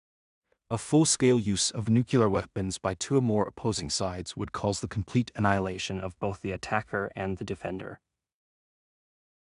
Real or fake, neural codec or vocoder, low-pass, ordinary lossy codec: fake; codec, 16 kHz in and 24 kHz out, 0.4 kbps, LongCat-Audio-Codec, two codebook decoder; 10.8 kHz; none